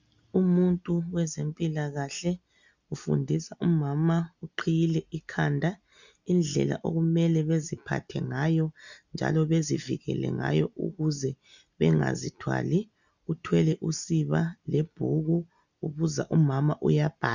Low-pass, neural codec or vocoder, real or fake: 7.2 kHz; none; real